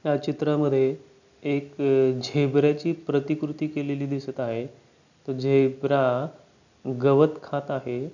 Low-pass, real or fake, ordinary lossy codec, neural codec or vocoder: 7.2 kHz; real; none; none